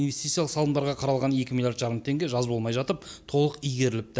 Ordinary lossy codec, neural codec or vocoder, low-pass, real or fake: none; none; none; real